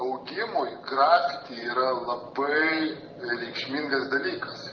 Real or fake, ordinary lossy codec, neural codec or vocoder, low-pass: real; Opus, 32 kbps; none; 7.2 kHz